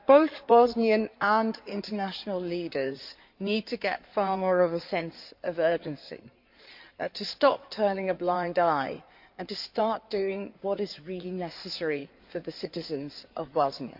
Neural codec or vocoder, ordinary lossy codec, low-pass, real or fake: codec, 16 kHz in and 24 kHz out, 2.2 kbps, FireRedTTS-2 codec; MP3, 48 kbps; 5.4 kHz; fake